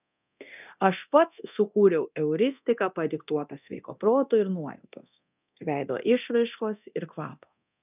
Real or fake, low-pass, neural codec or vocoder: fake; 3.6 kHz; codec, 24 kHz, 0.9 kbps, DualCodec